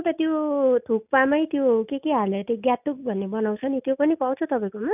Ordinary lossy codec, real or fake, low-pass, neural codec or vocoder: none; real; 3.6 kHz; none